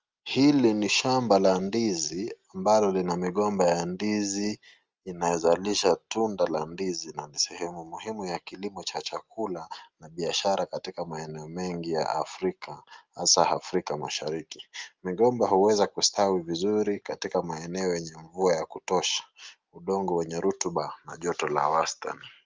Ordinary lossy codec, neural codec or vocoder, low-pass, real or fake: Opus, 24 kbps; none; 7.2 kHz; real